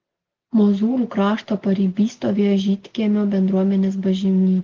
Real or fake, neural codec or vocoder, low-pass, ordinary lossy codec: real; none; 7.2 kHz; Opus, 16 kbps